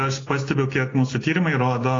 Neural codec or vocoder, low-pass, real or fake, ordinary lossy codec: none; 7.2 kHz; real; AAC, 32 kbps